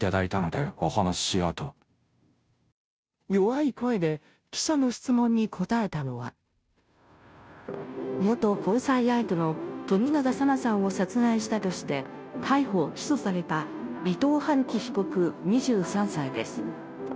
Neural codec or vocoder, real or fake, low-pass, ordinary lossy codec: codec, 16 kHz, 0.5 kbps, FunCodec, trained on Chinese and English, 25 frames a second; fake; none; none